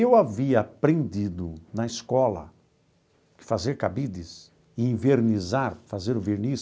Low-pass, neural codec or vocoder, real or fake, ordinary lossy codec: none; none; real; none